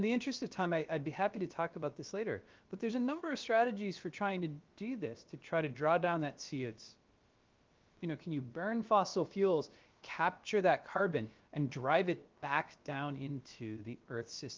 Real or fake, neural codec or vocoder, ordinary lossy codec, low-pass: fake; codec, 16 kHz, about 1 kbps, DyCAST, with the encoder's durations; Opus, 32 kbps; 7.2 kHz